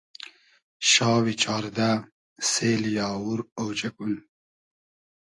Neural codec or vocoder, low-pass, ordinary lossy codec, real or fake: none; 10.8 kHz; AAC, 48 kbps; real